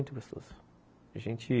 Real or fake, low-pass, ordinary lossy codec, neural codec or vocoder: real; none; none; none